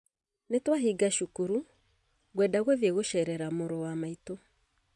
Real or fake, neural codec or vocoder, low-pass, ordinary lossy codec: real; none; 10.8 kHz; none